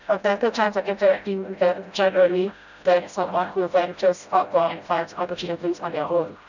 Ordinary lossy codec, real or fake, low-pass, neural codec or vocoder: none; fake; 7.2 kHz; codec, 16 kHz, 0.5 kbps, FreqCodec, smaller model